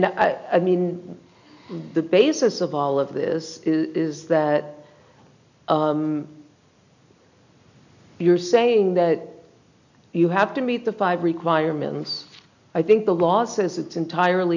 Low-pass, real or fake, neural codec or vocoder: 7.2 kHz; real; none